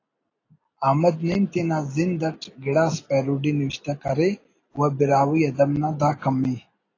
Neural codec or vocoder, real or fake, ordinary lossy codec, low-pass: none; real; AAC, 32 kbps; 7.2 kHz